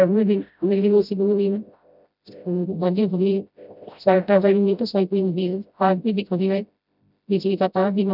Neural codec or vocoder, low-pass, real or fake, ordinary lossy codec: codec, 16 kHz, 0.5 kbps, FreqCodec, smaller model; 5.4 kHz; fake; none